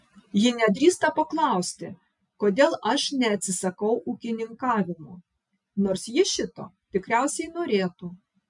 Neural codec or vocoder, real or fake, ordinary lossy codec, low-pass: none; real; MP3, 96 kbps; 10.8 kHz